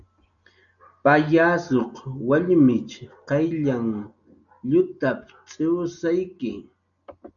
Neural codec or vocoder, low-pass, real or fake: none; 7.2 kHz; real